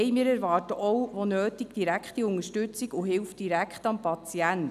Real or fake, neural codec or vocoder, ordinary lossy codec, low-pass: real; none; none; 14.4 kHz